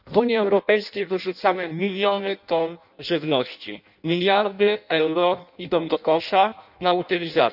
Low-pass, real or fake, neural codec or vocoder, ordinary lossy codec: 5.4 kHz; fake; codec, 16 kHz in and 24 kHz out, 0.6 kbps, FireRedTTS-2 codec; none